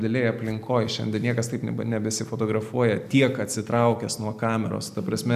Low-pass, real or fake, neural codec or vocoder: 14.4 kHz; real; none